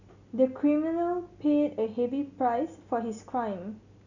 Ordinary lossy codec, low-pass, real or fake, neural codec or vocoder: none; 7.2 kHz; real; none